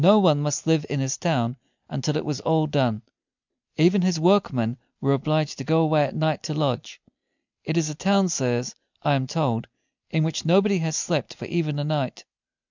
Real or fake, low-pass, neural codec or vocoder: real; 7.2 kHz; none